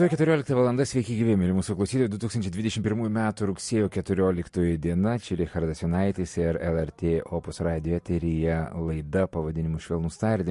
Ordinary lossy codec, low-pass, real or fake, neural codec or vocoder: MP3, 48 kbps; 14.4 kHz; real; none